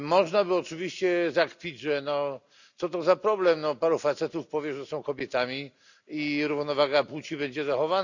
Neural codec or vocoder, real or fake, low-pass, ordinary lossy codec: none; real; 7.2 kHz; none